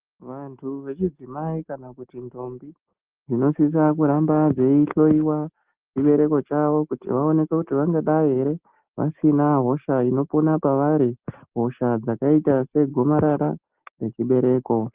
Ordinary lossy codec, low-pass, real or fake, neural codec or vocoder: Opus, 16 kbps; 3.6 kHz; real; none